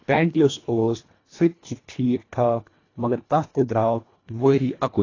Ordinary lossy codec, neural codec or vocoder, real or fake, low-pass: AAC, 32 kbps; codec, 24 kHz, 1.5 kbps, HILCodec; fake; 7.2 kHz